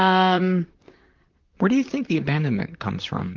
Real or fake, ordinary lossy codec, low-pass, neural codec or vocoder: fake; Opus, 32 kbps; 7.2 kHz; vocoder, 44.1 kHz, 128 mel bands, Pupu-Vocoder